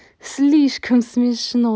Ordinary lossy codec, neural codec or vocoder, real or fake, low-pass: none; none; real; none